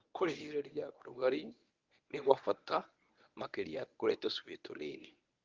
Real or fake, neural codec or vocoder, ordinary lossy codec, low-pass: fake; codec, 24 kHz, 0.9 kbps, WavTokenizer, medium speech release version 1; Opus, 32 kbps; 7.2 kHz